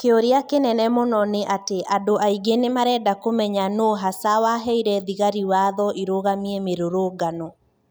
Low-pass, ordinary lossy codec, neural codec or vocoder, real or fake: none; none; none; real